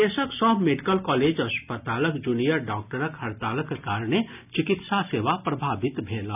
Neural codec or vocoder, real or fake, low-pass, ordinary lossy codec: none; real; 3.6 kHz; none